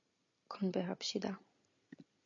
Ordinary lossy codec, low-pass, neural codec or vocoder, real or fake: AAC, 48 kbps; 7.2 kHz; none; real